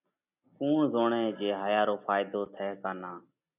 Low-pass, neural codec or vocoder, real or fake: 3.6 kHz; none; real